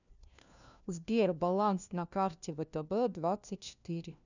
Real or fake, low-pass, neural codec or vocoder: fake; 7.2 kHz; codec, 16 kHz, 1 kbps, FunCodec, trained on LibriTTS, 50 frames a second